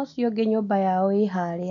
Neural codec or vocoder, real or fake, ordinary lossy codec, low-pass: none; real; none; 7.2 kHz